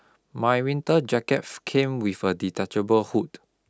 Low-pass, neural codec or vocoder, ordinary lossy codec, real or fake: none; none; none; real